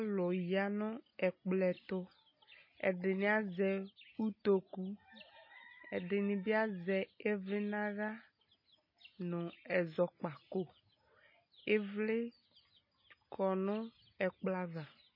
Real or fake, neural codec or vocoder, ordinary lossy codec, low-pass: real; none; MP3, 24 kbps; 5.4 kHz